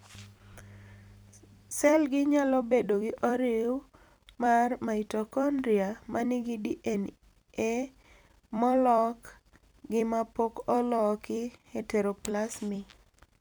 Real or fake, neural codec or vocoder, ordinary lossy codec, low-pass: fake; vocoder, 44.1 kHz, 128 mel bands every 256 samples, BigVGAN v2; none; none